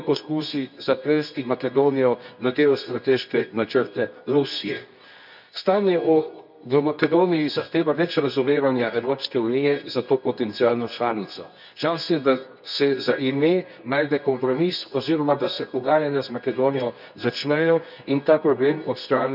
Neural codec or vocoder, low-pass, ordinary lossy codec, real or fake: codec, 24 kHz, 0.9 kbps, WavTokenizer, medium music audio release; 5.4 kHz; none; fake